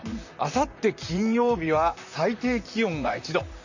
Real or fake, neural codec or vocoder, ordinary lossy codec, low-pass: fake; vocoder, 44.1 kHz, 128 mel bands, Pupu-Vocoder; Opus, 64 kbps; 7.2 kHz